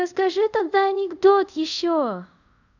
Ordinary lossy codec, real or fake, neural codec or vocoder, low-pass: none; fake; codec, 24 kHz, 0.5 kbps, DualCodec; 7.2 kHz